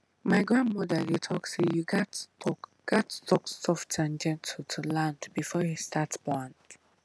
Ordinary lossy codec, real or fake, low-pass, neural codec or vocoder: none; real; none; none